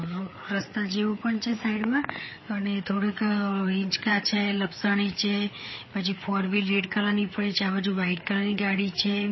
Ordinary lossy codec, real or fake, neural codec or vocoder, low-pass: MP3, 24 kbps; fake; codec, 16 kHz, 4 kbps, FreqCodec, larger model; 7.2 kHz